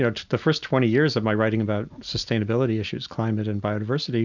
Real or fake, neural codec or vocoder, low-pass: real; none; 7.2 kHz